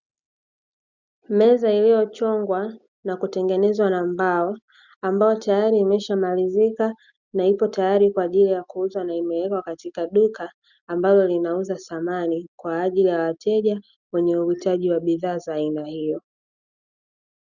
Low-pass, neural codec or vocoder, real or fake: 7.2 kHz; none; real